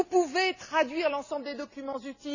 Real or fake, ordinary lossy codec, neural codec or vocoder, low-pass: real; none; none; 7.2 kHz